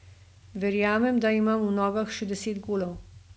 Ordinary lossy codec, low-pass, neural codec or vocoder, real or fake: none; none; none; real